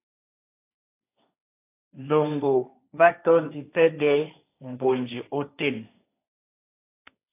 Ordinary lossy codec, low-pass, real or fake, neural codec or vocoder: AAC, 24 kbps; 3.6 kHz; fake; codec, 16 kHz, 1.1 kbps, Voila-Tokenizer